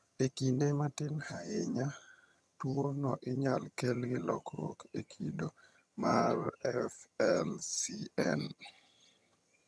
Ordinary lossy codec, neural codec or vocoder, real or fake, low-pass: none; vocoder, 22.05 kHz, 80 mel bands, HiFi-GAN; fake; none